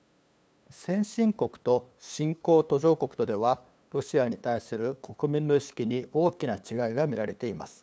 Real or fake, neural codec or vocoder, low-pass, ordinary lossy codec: fake; codec, 16 kHz, 2 kbps, FunCodec, trained on LibriTTS, 25 frames a second; none; none